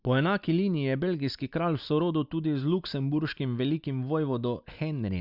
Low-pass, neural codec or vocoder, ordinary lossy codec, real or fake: 5.4 kHz; none; Opus, 64 kbps; real